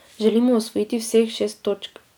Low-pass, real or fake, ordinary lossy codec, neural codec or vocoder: none; real; none; none